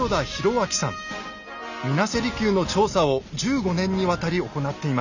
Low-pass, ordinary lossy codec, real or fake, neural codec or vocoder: 7.2 kHz; none; real; none